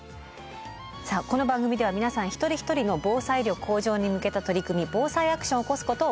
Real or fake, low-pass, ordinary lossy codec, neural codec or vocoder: real; none; none; none